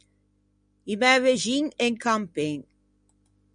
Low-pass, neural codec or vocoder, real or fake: 9.9 kHz; none; real